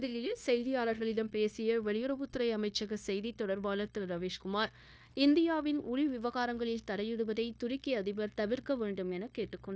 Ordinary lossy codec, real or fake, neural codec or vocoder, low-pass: none; fake; codec, 16 kHz, 0.9 kbps, LongCat-Audio-Codec; none